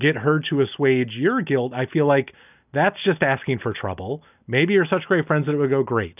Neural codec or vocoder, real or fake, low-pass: none; real; 3.6 kHz